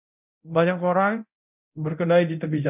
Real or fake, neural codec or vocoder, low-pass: fake; codec, 24 kHz, 0.9 kbps, DualCodec; 3.6 kHz